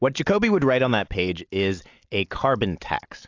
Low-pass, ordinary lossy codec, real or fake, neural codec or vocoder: 7.2 kHz; AAC, 48 kbps; real; none